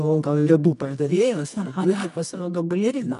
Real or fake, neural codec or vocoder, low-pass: fake; codec, 24 kHz, 0.9 kbps, WavTokenizer, medium music audio release; 10.8 kHz